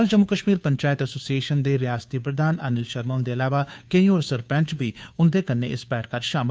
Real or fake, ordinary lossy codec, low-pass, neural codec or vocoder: fake; none; none; codec, 16 kHz, 2 kbps, FunCodec, trained on Chinese and English, 25 frames a second